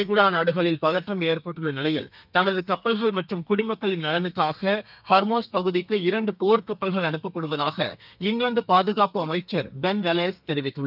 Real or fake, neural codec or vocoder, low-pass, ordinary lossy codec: fake; codec, 32 kHz, 1.9 kbps, SNAC; 5.4 kHz; none